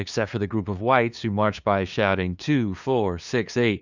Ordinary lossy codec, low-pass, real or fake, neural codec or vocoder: Opus, 64 kbps; 7.2 kHz; fake; codec, 16 kHz, 2 kbps, FunCodec, trained on LibriTTS, 25 frames a second